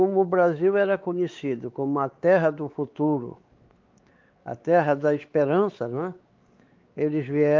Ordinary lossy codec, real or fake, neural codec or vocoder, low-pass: Opus, 24 kbps; fake; codec, 16 kHz, 4 kbps, X-Codec, WavLM features, trained on Multilingual LibriSpeech; 7.2 kHz